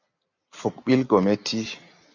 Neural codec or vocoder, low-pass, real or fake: none; 7.2 kHz; real